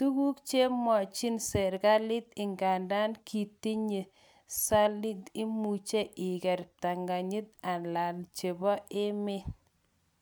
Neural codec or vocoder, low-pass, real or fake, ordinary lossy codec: none; none; real; none